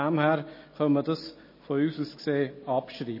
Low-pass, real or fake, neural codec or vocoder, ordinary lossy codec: 5.4 kHz; real; none; MP3, 24 kbps